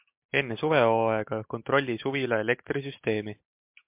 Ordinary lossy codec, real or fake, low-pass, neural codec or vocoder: MP3, 32 kbps; real; 3.6 kHz; none